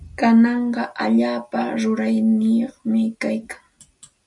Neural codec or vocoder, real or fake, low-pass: none; real; 10.8 kHz